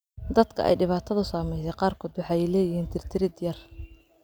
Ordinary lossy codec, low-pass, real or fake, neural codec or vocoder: none; none; real; none